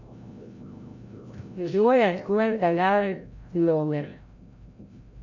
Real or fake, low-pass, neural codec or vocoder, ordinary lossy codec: fake; 7.2 kHz; codec, 16 kHz, 0.5 kbps, FreqCodec, larger model; MP3, 64 kbps